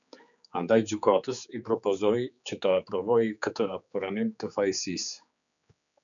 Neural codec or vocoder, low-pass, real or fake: codec, 16 kHz, 4 kbps, X-Codec, HuBERT features, trained on general audio; 7.2 kHz; fake